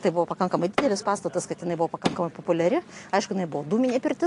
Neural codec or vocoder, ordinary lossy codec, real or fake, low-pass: none; AAC, 48 kbps; real; 10.8 kHz